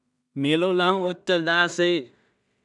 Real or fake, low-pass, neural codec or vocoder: fake; 10.8 kHz; codec, 16 kHz in and 24 kHz out, 0.4 kbps, LongCat-Audio-Codec, two codebook decoder